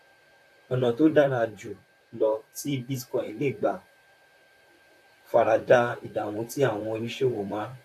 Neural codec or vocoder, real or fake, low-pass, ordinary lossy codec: vocoder, 44.1 kHz, 128 mel bands, Pupu-Vocoder; fake; 14.4 kHz; AAC, 64 kbps